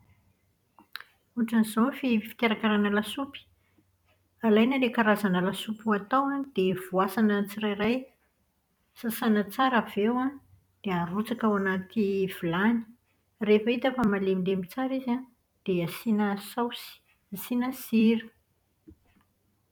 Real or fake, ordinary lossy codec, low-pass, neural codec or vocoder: fake; none; 19.8 kHz; vocoder, 44.1 kHz, 128 mel bands every 256 samples, BigVGAN v2